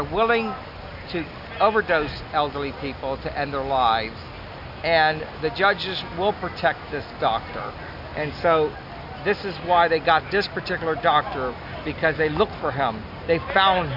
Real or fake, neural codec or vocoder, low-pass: real; none; 5.4 kHz